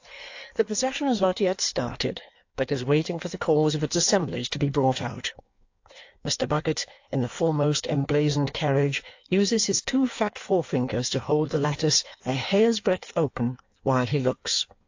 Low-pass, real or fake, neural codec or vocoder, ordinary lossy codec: 7.2 kHz; fake; codec, 16 kHz in and 24 kHz out, 1.1 kbps, FireRedTTS-2 codec; AAC, 48 kbps